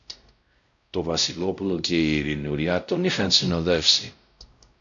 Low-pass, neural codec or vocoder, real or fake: 7.2 kHz; codec, 16 kHz, 0.5 kbps, X-Codec, WavLM features, trained on Multilingual LibriSpeech; fake